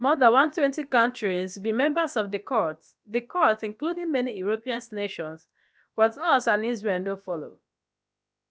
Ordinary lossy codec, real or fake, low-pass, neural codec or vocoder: none; fake; none; codec, 16 kHz, about 1 kbps, DyCAST, with the encoder's durations